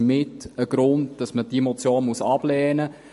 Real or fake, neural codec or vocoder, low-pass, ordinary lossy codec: real; none; 14.4 kHz; MP3, 48 kbps